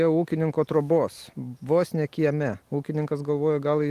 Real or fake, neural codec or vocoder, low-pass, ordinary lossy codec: real; none; 14.4 kHz; Opus, 24 kbps